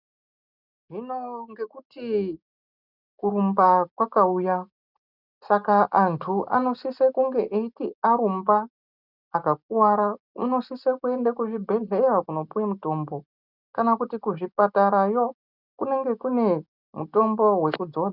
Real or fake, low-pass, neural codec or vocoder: real; 5.4 kHz; none